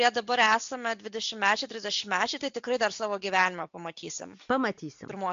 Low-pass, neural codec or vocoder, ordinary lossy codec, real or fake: 7.2 kHz; none; AAC, 64 kbps; real